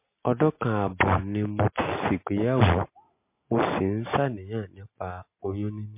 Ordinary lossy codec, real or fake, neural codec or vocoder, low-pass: MP3, 24 kbps; real; none; 3.6 kHz